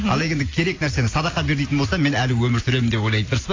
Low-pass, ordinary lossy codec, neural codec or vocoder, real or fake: 7.2 kHz; AAC, 32 kbps; none; real